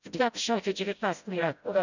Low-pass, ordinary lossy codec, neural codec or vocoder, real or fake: 7.2 kHz; none; codec, 16 kHz, 0.5 kbps, FreqCodec, smaller model; fake